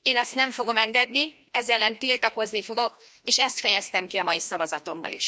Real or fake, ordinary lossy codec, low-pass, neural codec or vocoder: fake; none; none; codec, 16 kHz, 1 kbps, FreqCodec, larger model